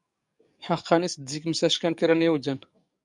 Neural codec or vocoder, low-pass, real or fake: codec, 44.1 kHz, 7.8 kbps, DAC; 10.8 kHz; fake